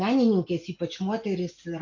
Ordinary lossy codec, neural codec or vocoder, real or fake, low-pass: AAC, 48 kbps; none; real; 7.2 kHz